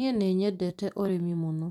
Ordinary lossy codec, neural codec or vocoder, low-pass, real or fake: none; vocoder, 44.1 kHz, 128 mel bands every 256 samples, BigVGAN v2; 19.8 kHz; fake